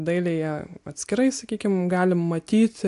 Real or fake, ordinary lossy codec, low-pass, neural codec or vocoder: real; AAC, 64 kbps; 10.8 kHz; none